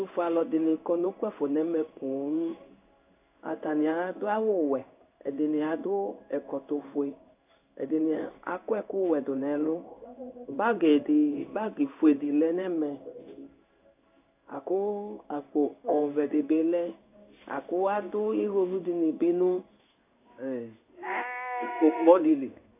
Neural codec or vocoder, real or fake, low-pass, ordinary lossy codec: codec, 16 kHz in and 24 kHz out, 1 kbps, XY-Tokenizer; fake; 3.6 kHz; AAC, 32 kbps